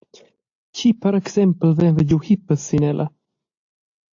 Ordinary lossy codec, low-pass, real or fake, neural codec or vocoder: AAC, 48 kbps; 7.2 kHz; real; none